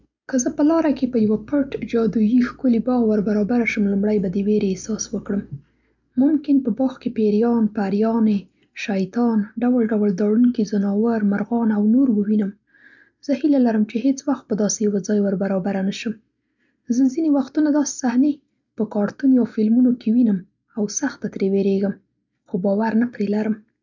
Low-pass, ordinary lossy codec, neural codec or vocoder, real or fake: 7.2 kHz; none; none; real